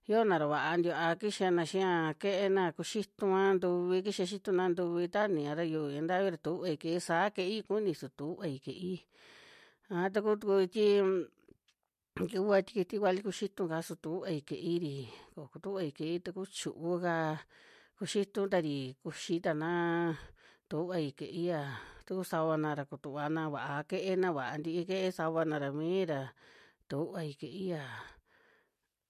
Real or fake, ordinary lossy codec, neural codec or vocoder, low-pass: real; MP3, 64 kbps; none; 14.4 kHz